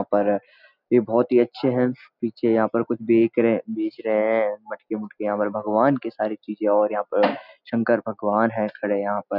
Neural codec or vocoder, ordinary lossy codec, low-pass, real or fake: none; none; 5.4 kHz; real